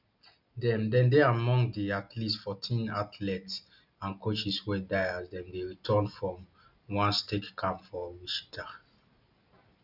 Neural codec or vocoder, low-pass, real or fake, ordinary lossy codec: none; 5.4 kHz; real; none